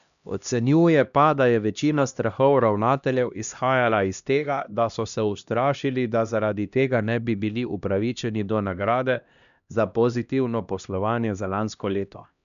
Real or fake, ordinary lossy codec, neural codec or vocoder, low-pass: fake; none; codec, 16 kHz, 1 kbps, X-Codec, HuBERT features, trained on LibriSpeech; 7.2 kHz